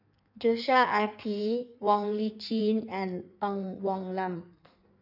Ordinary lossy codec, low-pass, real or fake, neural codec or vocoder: none; 5.4 kHz; fake; codec, 16 kHz in and 24 kHz out, 1.1 kbps, FireRedTTS-2 codec